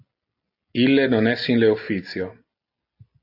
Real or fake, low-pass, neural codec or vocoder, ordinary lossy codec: real; 5.4 kHz; none; AAC, 32 kbps